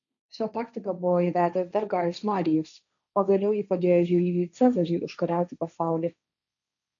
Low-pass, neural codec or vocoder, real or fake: 7.2 kHz; codec, 16 kHz, 1.1 kbps, Voila-Tokenizer; fake